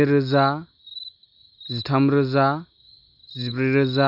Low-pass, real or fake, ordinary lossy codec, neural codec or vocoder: 5.4 kHz; real; none; none